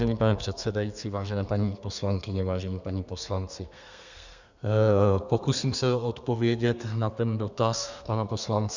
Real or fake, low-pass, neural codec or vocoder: fake; 7.2 kHz; codec, 32 kHz, 1.9 kbps, SNAC